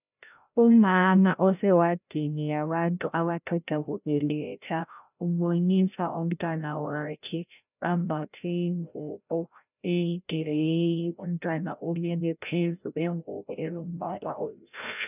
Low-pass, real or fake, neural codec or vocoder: 3.6 kHz; fake; codec, 16 kHz, 0.5 kbps, FreqCodec, larger model